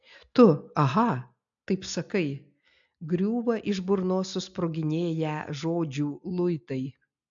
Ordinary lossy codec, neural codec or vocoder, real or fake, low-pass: AAC, 64 kbps; none; real; 7.2 kHz